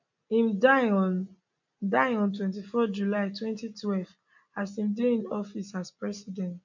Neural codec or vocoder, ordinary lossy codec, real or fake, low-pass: none; none; real; 7.2 kHz